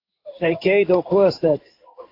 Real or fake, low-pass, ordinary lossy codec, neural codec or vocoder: fake; 5.4 kHz; AAC, 32 kbps; codec, 16 kHz in and 24 kHz out, 1 kbps, XY-Tokenizer